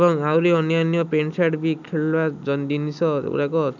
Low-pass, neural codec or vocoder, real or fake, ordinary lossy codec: 7.2 kHz; none; real; none